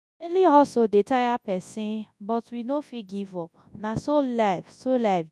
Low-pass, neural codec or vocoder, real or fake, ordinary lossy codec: none; codec, 24 kHz, 0.9 kbps, WavTokenizer, large speech release; fake; none